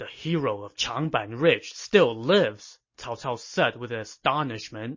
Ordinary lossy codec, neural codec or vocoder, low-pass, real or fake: MP3, 32 kbps; none; 7.2 kHz; real